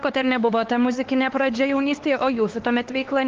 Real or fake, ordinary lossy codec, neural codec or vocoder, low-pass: fake; Opus, 16 kbps; codec, 16 kHz, 4 kbps, X-Codec, HuBERT features, trained on LibriSpeech; 7.2 kHz